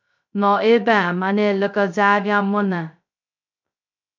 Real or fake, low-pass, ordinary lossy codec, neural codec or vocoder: fake; 7.2 kHz; MP3, 48 kbps; codec, 16 kHz, 0.2 kbps, FocalCodec